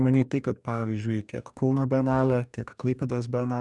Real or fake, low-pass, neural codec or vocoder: fake; 10.8 kHz; codec, 44.1 kHz, 2.6 kbps, DAC